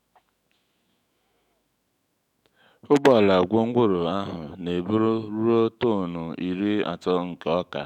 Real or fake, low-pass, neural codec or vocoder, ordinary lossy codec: fake; 19.8 kHz; autoencoder, 48 kHz, 128 numbers a frame, DAC-VAE, trained on Japanese speech; none